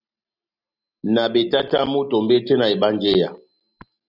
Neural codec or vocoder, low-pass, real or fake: none; 5.4 kHz; real